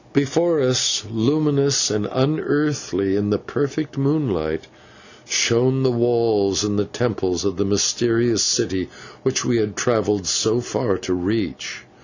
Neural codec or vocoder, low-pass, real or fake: none; 7.2 kHz; real